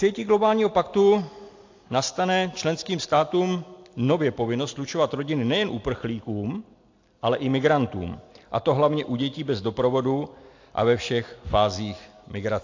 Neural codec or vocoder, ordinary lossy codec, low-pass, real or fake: none; AAC, 48 kbps; 7.2 kHz; real